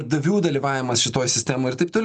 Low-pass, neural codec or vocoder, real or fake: 10.8 kHz; none; real